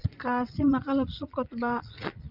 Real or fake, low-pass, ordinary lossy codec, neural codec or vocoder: fake; 5.4 kHz; none; vocoder, 22.05 kHz, 80 mel bands, Vocos